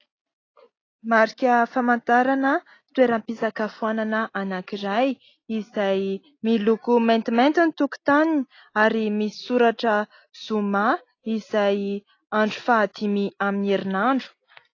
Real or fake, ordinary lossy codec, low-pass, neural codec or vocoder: real; AAC, 32 kbps; 7.2 kHz; none